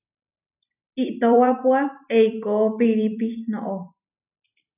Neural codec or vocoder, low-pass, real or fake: vocoder, 44.1 kHz, 128 mel bands every 256 samples, BigVGAN v2; 3.6 kHz; fake